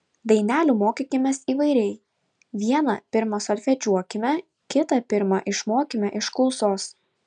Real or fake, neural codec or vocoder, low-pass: real; none; 9.9 kHz